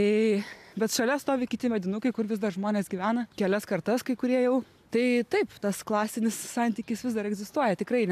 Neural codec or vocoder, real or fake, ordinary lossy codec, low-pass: none; real; AAC, 96 kbps; 14.4 kHz